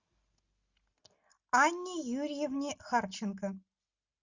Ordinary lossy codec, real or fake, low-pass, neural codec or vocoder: Opus, 64 kbps; real; 7.2 kHz; none